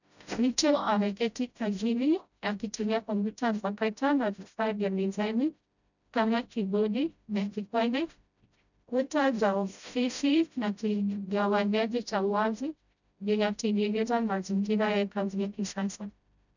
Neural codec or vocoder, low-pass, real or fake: codec, 16 kHz, 0.5 kbps, FreqCodec, smaller model; 7.2 kHz; fake